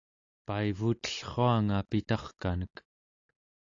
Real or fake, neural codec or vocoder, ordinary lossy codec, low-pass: real; none; AAC, 48 kbps; 7.2 kHz